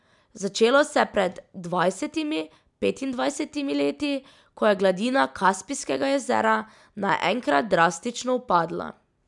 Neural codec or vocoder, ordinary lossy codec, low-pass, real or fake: none; none; 10.8 kHz; real